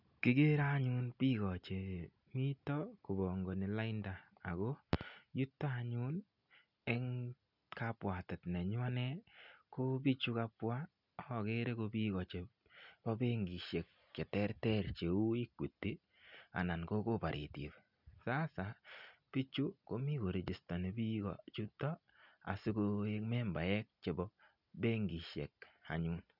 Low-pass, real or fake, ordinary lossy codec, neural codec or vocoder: 5.4 kHz; real; none; none